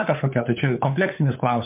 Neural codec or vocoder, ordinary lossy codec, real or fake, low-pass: codec, 16 kHz, 4 kbps, FunCodec, trained on LibriTTS, 50 frames a second; MP3, 32 kbps; fake; 3.6 kHz